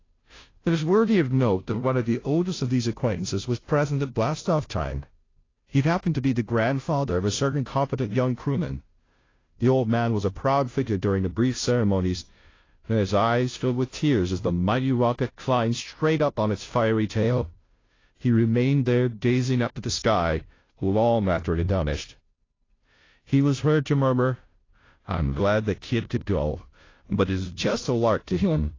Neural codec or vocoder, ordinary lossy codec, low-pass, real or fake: codec, 16 kHz, 0.5 kbps, FunCodec, trained on Chinese and English, 25 frames a second; AAC, 32 kbps; 7.2 kHz; fake